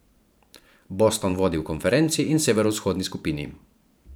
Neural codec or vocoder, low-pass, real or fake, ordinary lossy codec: vocoder, 44.1 kHz, 128 mel bands every 512 samples, BigVGAN v2; none; fake; none